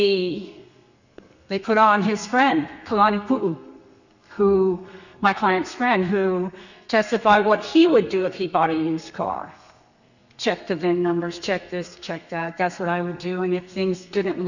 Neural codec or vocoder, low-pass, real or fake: codec, 32 kHz, 1.9 kbps, SNAC; 7.2 kHz; fake